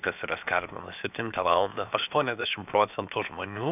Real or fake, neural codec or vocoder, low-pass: fake; codec, 16 kHz, 0.7 kbps, FocalCodec; 3.6 kHz